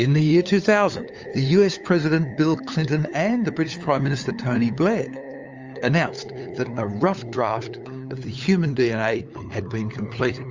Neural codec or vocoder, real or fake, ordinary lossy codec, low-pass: codec, 16 kHz, 8 kbps, FunCodec, trained on LibriTTS, 25 frames a second; fake; Opus, 32 kbps; 7.2 kHz